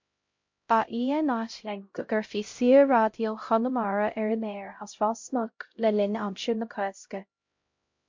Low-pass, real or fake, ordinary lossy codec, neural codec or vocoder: 7.2 kHz; fake; MP3, 48 kbps; codec, 16 kHz, 0.5 kbps, X-Codec, HuBERT features, trained on LibriSpeech